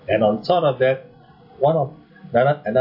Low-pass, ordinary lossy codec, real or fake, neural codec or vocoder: 5.4 kHz; MP3, 48 kbps; real; none